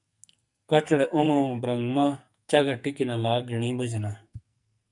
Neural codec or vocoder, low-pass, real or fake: codec, 44.1 kHz, 2.6 kbps, SNAC; 10.8 kHz; fake